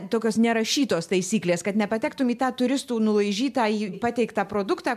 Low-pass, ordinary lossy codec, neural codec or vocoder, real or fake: 14.4 kHz; MP3, 96 kbps; none; real